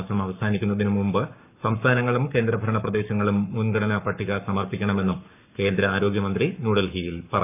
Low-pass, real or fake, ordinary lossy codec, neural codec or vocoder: 3.6 kHz; fake; none; codec, 44.1 kHz, 7.8 kbps, Pupu-Codec